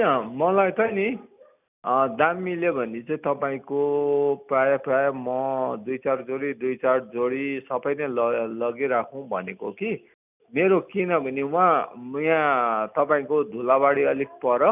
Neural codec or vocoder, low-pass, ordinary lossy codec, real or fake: none; 3.6 kHz; none; real